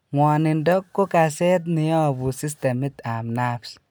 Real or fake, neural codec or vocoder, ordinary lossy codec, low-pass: real; none; none; none